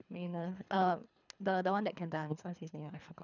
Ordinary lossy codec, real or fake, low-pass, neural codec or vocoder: none; fake; 7.2 kHz; codec, 24 kHz, 3 kbps, HILCodec